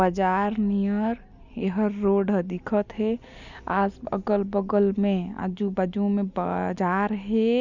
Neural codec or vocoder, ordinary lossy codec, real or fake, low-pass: none; none; real; 7.2 kHz